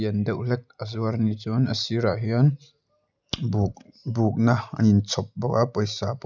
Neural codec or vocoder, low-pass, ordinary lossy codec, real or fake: none; 7.2 kHz; none; real